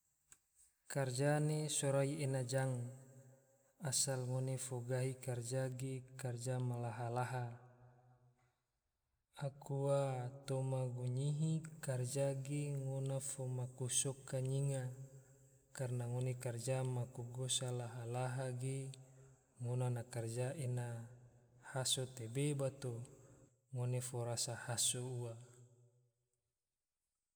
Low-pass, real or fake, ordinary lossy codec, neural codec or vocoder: none; real; none; none